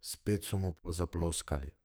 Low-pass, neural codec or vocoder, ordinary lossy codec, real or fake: none; codec, 44.1 kHz, 2.6 kbps, SNAC; none; fake